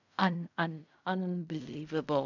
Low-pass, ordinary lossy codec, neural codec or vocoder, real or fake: 7.2 kHz; none; codec, 16 kHz in and 24 kHz out, 0.4 kbps, LongCat-Audio-Codec, fine tuned four codebook decoder; fake